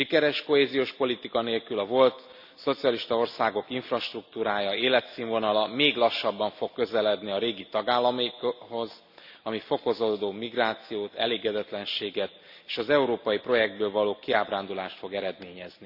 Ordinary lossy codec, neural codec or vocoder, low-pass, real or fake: none; none; 5.4 kHz; real